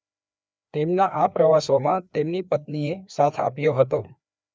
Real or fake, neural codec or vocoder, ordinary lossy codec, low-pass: fake; codec, 16 kHz, 2 kbps, FreqCodec, larger model; none; none